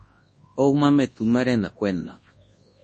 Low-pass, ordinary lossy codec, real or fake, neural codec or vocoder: 10.8 kHz; MP3, 32 kbps; fake; codec, 24 kHz, 0.9 kbps, WavTokenizer, large speech release